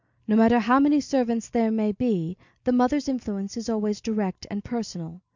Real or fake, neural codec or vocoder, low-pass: real; none; 7.2 kHz